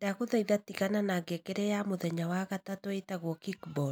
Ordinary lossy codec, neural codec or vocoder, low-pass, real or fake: none; none; none; real